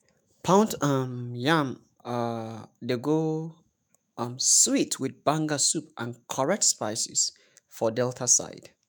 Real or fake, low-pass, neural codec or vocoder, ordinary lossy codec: fake; none; autoencoder, 48 kHz, 128 numbers a frame, DAC-VAE, trained on Japanese speech; none